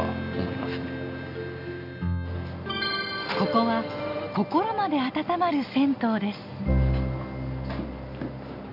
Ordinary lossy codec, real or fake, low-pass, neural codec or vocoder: none; real; 5.4 kHz; none